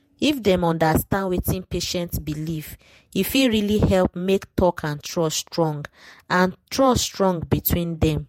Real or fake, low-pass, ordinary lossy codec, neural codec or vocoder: fake; 19.8 kHz; MP3, 64 kbps; vocoder, 48 kHz, 128 mel bands, Vocos